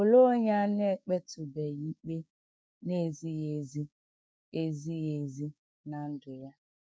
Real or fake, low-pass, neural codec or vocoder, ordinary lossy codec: fake; none; codec, 16 kHz, 6 kbps, DAC; none